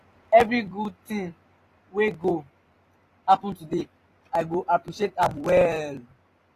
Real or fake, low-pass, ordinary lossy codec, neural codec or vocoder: fake; 14.4 kHz; AAC, 48 kbps; vocoder, 44.1 kHz, 128 mel bands every 256 samples, BigVGAN v2